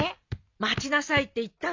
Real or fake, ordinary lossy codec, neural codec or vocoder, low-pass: real; MP3, 48 kbps; none; 7.2 kHz